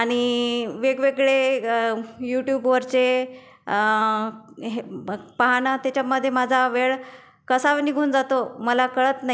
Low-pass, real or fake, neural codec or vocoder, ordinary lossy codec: none; real; none; none